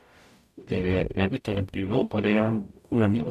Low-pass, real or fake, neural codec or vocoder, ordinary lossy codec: 14.4 kHz; fake; codec, 44.1 kHz, 0.9 kbps, DAC; none